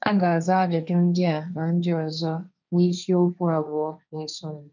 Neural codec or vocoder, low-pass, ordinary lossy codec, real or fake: codec, 16 kHz, 1.1 kbps, Voila-Tokenizer; 7.2 kHz; none; fake